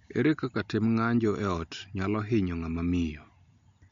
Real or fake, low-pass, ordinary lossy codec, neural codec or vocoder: real; 7.2 kHz; MP3, 48 kbps; none